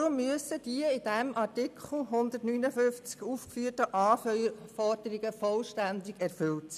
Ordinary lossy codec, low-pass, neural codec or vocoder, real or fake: none; 14.4 kHz; none; real